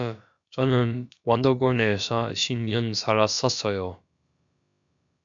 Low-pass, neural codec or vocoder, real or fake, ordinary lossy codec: 7.2 kHz; codec, 16 kHz, about 1 kbps, DyCAST, with the encoder's durations; fake; MP3, 64 kbps